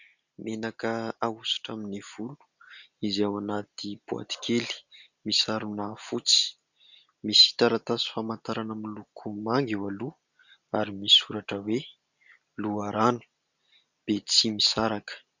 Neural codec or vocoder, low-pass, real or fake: none; 7.2 kHz; real